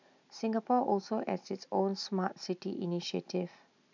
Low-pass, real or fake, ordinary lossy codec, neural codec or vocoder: 7.2 kHz; real; none; none